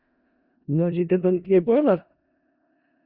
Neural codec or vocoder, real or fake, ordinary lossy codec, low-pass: codec, 16 kHz in and 24 kHz out, 0.4 kbps, LongCat-Audio-Codec, four codebook decoder; fake; Opus, 64 kbps; 5.4 kHz